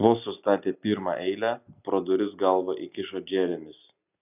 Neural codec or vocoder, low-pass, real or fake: none; 3.6 kHz; real